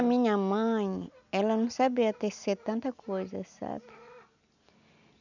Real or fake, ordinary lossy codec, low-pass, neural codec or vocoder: real; none; 7.2 kHz; none